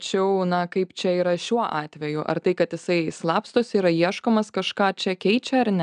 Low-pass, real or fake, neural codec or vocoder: 9.9 kHz; real; none